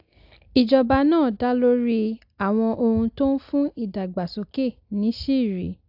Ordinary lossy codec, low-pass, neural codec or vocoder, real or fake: AAC, 48 kbps; 5.4 kHz; none; real